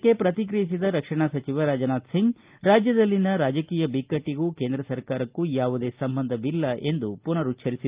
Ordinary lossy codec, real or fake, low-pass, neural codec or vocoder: Opus, 32 kbps; real; 3.6 kHz; none